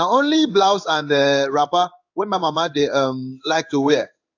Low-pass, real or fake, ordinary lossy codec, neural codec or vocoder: 7.2 kHz; fake; AAC, 48 kbps; codec, 16 kHz in and 24 kHz out, 1 kbps, XY-Tokenizer